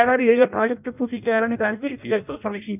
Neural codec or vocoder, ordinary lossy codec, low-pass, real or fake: codec, 16 kHz in and 24 kHz out, 0.6 kbps, FireRedTTS-2 codec; none; 3.6 kHz; fake